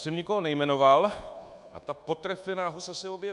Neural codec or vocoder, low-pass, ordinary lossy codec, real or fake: codec, 24 kHz, 1.2 kbps, DualCodec; 10.8 kHz; AAC, 96 kbps; fake